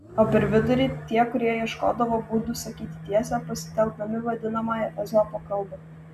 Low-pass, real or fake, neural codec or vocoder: 14.4 kHz; real; none